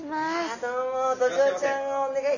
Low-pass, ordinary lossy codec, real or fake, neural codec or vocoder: 7.2 kHz; none; real; none